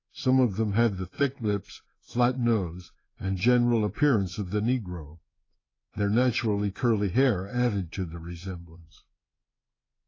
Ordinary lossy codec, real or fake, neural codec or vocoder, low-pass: AAC, 32 kbps; fake; codec, 16 kHz in and 24 kHz out, 1 kbps, XY-Tokenizer; 7.2 kHz